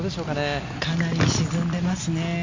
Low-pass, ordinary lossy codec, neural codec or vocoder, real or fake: 7.2 kHz; MP3, 48 kbps; none; real